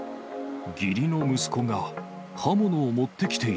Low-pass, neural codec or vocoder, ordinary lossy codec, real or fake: none; none; none; real